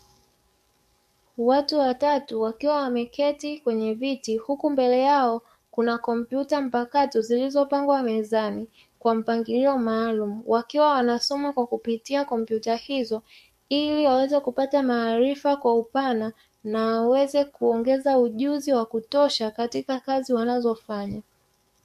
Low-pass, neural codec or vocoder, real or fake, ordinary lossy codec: 14.4 kHz; codec, 44.1 kHz, 7.8 kbps, DAC; fake; MP3, 64 kbps